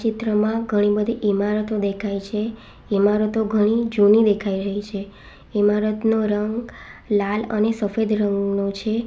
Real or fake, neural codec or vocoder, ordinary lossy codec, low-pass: real; none; none; none